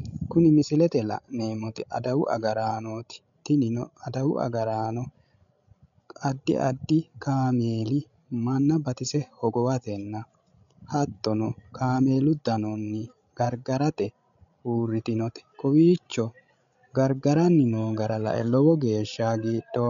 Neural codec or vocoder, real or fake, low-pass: codec, 16 kHz, 16 kbps, FreqCodec, larger model; fake; 7.2 kHz